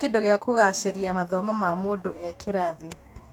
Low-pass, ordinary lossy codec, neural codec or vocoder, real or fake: 19.8 kHz; none; codec, 44.1 kHz, 2.6 kbps, DAC; fake